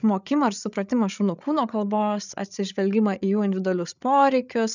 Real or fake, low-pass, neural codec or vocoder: fake; 7.2 kHz; codec, 16 kHz, 8 kbps, FreqCodec, larger model